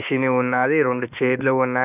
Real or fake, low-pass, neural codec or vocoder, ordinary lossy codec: fake; 3.6 kHz; autoencoder, 48 kHz, 32 numbers a frame, DAC-VAE, trained on Japanese speech; none